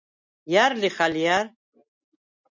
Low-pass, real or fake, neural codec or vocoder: 7.2 kHz; real; none